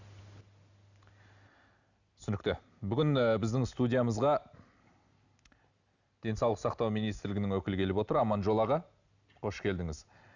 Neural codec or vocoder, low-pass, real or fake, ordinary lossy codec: none; 7.2 kHz; real; none